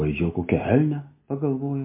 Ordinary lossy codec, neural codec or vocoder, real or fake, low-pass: MP3, 24 kbps; none; real; 3.6 kHz